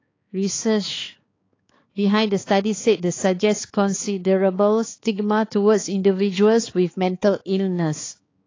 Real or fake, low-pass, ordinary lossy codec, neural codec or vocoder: fake; 7.2 kHz; AAC, 32 kbps; codec, 16 kHz, 4 kbps, X-Codec, HuBERT features, trained on balanced general audio